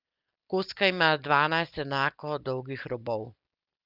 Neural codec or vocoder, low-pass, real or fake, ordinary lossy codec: none; 5.4 kHz; real; Opus, 24 kbps